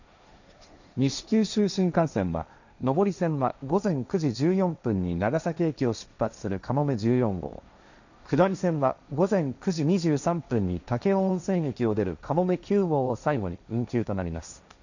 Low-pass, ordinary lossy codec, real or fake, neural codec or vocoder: 7.2 kHz; none; fake; codec, 16 kHz, 1.1 kbps, Voila-Tokenizer